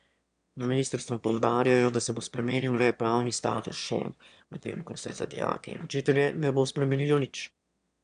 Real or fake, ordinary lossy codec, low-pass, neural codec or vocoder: fake; AAC, 96 kbps; 9.9 kHz; autoencoder, 22.05 kHz, a latent of 192 numbers a frame, VITS, trained on one speaker